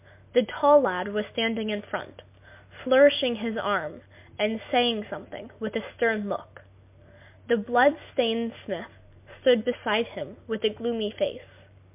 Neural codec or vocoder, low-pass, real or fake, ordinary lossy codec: none; 3.6 kHz; real; MP3, 32 kbps